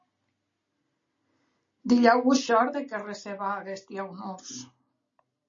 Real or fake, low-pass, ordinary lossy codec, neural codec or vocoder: real; 7.2 kHz; MP3, 32 kbps; none